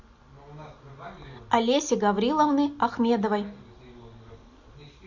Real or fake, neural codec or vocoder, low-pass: real; none; 7.2 kHz